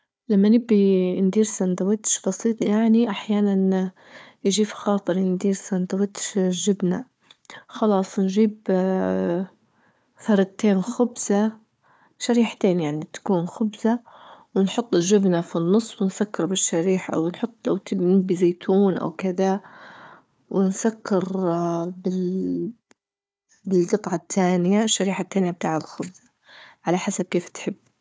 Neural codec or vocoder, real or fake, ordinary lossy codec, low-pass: codec, 16 kHz, 4 kbps, FunCodec, trained on Chinese and English, 50 frames a second; fake; none; none